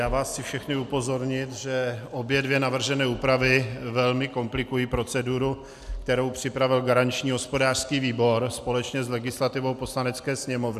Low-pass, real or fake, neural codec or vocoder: 14.4 kHz; real; none